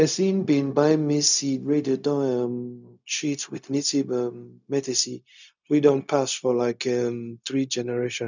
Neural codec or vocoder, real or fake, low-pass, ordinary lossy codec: codec, 16 kHz, 0.4 kbps, LongCat-Audio-Codec; fake; 7.2 kHz; none